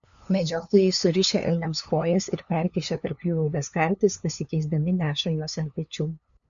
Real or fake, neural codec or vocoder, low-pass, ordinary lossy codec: fake; codec, 16 kHz, 2 kbps, FunCodec, trained on LibriTTS, 25 frames a second; 7.2 kHz; MP3, 96 kbps